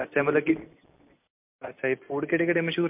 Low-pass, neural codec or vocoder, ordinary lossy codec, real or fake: 3.6 kHz; none; MP3, 32 kbps; real